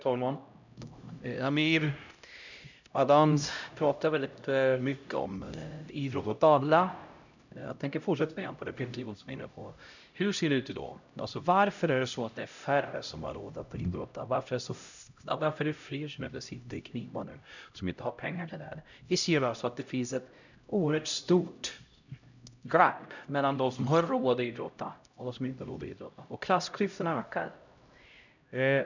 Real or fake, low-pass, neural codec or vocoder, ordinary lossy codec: fake; 7.2 kHz; codec, 16 kHz, 0.5 kbps, X-Codec, HuBERT features, trained on LibriSpeech; none